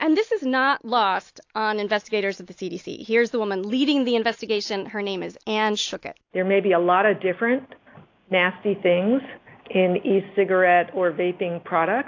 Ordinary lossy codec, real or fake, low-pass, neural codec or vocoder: AAC, 48 kbps; real; 7.2 kHz; none